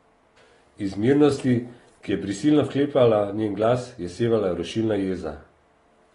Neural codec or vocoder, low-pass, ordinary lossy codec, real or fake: none; 10.8 kHz; AAC, 32 kbps; real